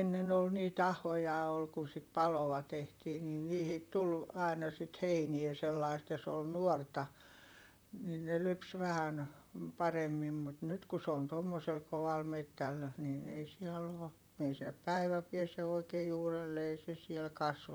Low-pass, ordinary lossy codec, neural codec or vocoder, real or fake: none; none; vocoder, 44.1 kHz, 128 mel bands, Pupu-Vocoder; fake